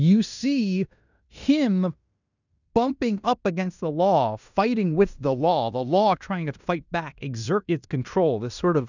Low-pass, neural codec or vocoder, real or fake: 7.2 kHz; codec, 16 kHz in and 24 kHz out, 0.9 kbps, LongCat-Audio-Codec, fine tuned four codebook decoder; fake